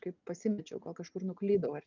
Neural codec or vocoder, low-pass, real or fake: none; 7.2 kHz; real